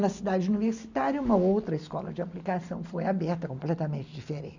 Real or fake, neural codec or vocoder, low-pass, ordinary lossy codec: real; none; 7.2 kHz; none